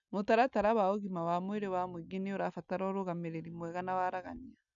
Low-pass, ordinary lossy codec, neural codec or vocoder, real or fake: 7.2 kHz; none; none; real